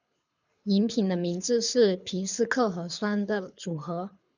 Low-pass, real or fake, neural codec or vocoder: 7.2 kHz; fake; codec, 24 kHz, 6 kbps, HILCodec